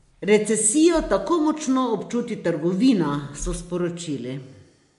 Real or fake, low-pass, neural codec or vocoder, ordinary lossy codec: real; 10.8 kHz; none; MP3, 64 kbps